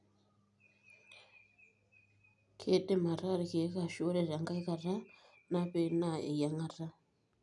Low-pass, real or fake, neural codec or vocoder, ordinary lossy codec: 10.8 kHz; real; none; none